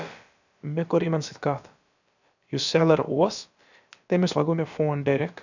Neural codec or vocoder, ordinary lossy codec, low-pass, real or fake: codec, 16 kHz, about 1 kbps, DyCAST, with the encoder's durations; none; 7.2 kHz; fake